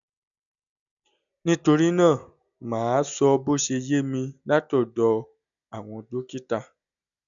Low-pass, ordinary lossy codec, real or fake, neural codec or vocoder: 7.2 kHz; none; real; none